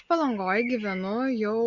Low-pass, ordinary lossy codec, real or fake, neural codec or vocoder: 7.2 kHz; Opus, 64 kbps; real; none